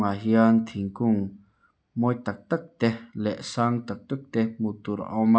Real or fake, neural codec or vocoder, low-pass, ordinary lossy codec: real; none; none; none